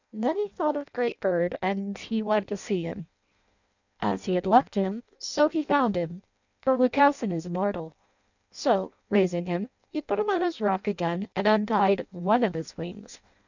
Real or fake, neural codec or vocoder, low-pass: fake; codec, 16 kHz in and 24 kHz out, 0.6 kbps, FireRedTTS-2 codec; 7.2 kHz